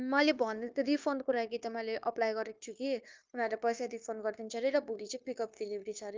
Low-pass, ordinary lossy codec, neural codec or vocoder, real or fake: 7.2 kHz; Opus, 24 kbps; codec, 16 kHz, 0.9 kbps, LongCat-Audio-Codec; fake